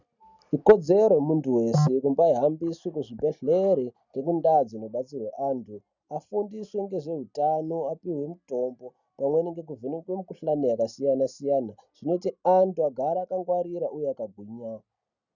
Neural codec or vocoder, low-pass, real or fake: none; 7.2 kHz; real